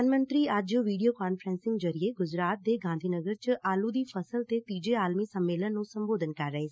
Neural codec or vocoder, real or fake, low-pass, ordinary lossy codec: none; real; none; none